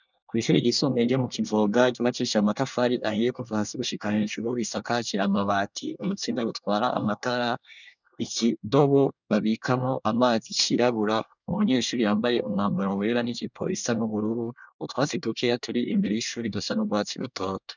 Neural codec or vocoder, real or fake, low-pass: codec, 24 kHz, 1 kbps, SNAC; fake; 7.2 kHz